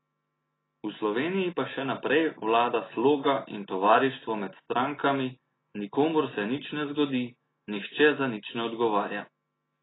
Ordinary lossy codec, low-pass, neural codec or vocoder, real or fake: AAC, 16 kbps; 7.2 kHz; none; real